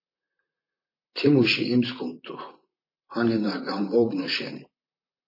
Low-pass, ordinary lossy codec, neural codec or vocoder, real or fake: 5.4 kHz; MP3, 24 kbps; vocoder, 44.1 kHz, 128 mel bands, Pupu-Vocoder; fake